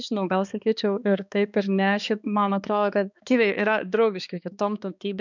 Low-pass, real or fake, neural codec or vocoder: 7.2 kHz; fake; codec, 16 kHz, 2 kbps, X-Codec, HuBERT features, trained on balanced general audio